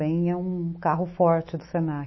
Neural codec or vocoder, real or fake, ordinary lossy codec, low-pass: autoencoder, 48 kHz, 128 numbers a frame, DAC-VAE, trained on Japanese speech; fake; MP3, 24 kbps; 7.2 kHz